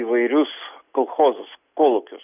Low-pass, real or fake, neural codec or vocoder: 3.6 kHz; real; none